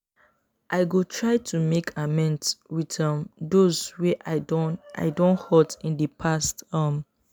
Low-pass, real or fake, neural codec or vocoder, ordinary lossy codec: none; real; none; none